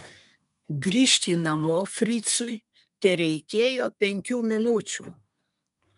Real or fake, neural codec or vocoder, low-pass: fake; codec, 24 kHz, 1 kbps, SNAC; 10.8 kHz